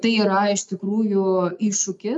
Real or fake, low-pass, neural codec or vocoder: real; 10.8 kHz; none